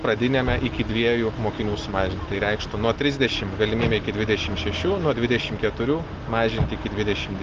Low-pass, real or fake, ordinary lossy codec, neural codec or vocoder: 7.2 kHz; real; Opus, 16 kbps; none